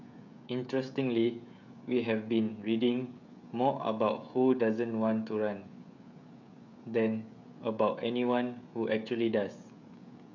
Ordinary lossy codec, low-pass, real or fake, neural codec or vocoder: none; 7.2 kHz; fake; codec, 16 kHz, 16 kbps, FreqCodec, smaller model